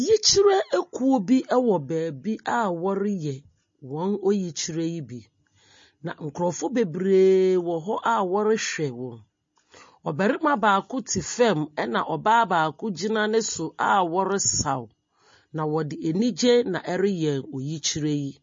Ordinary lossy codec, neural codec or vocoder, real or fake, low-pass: MP3, 32 kbps; none; real; 7.2 kHz